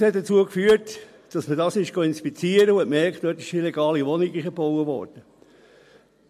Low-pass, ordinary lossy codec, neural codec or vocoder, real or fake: 14.4 kHz; MP3, 64 kbps; none; real